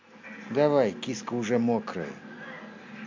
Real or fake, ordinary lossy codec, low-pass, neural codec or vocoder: real; MP3, 48 kbps; 7.2 kHz; none